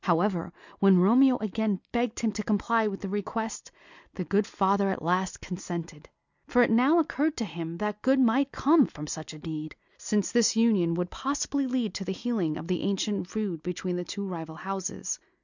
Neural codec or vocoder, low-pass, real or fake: none; 7.2 kHz; real